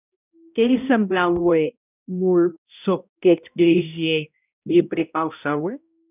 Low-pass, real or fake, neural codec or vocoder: 3.6 kHz; fake; codec, 16 kHz, 0.5 kbps, X-Codec, HuBERT features, trained on balanced general audio